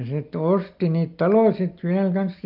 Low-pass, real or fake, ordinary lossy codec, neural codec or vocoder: 5.4 kHz; real; Opus, 32 kbps; none